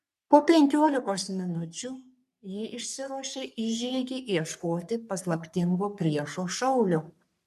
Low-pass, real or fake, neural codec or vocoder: 14.4 kHz; fake; codec, 44.1 kHz, 3.4 kbps, Pupu-Codec